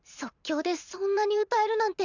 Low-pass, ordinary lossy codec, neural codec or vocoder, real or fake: 7.2 kHz; none; vocoder, 44.1 kHz, 128 mel bands every 512 samples, BigVGAN v2; fake